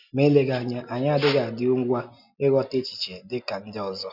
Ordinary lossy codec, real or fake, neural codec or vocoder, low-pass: none; real; none; 5.4 kHz